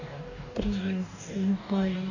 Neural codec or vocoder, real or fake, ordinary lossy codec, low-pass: codec, 44.1 kHz, 2.6 kbps, DAC; fake; none; 7.2 kHz